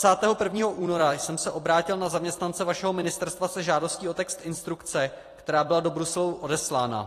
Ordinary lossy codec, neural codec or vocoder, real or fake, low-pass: AAC, 48 kbps; vocoder, 44.1 kHz, 128 mel bands every 256 samples, BigVGAN v2; fake; 14.4 kHz